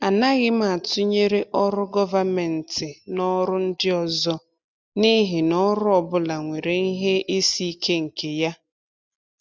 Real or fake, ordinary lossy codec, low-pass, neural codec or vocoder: real; none; none; none